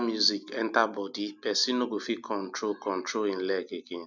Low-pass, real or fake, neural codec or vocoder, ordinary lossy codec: 7.2 kHz; real; none; none